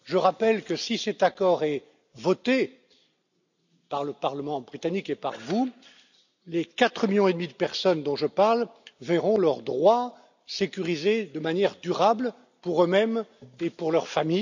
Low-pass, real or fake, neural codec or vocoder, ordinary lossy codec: 7.2 kHz; real; none; none